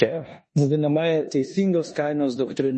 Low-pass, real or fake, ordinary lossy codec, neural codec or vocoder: 10.8 kHz; fake; MP3, 32 kbps; codec, 16 kHz in and 24 kHz out, 0.9 kbps, LongCat-Audio-Codec, four codebook decoder